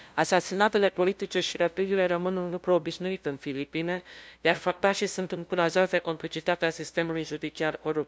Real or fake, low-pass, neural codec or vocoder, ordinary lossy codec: fake; none; codec, 16 kHz, 0.5 kbps, FunCodec, trained on LibriTTS, 25 frames a second; none